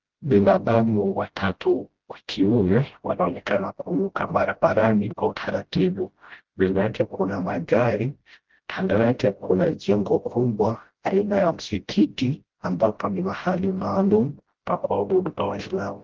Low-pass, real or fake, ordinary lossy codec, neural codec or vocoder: 7.2 kHz; fake; Opus, 16 kbps; codec, 16 kHz, 0.5 kbps, FreqCodec, smaller model